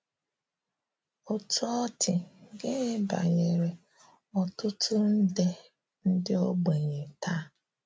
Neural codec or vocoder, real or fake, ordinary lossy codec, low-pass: none; real; none; none